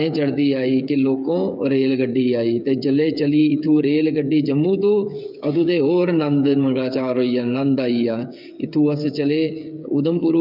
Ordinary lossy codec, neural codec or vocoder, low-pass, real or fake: none; codec, 16 kHz, 8 kbps, FreqCodec, smaller model; 5.4 kHz; fake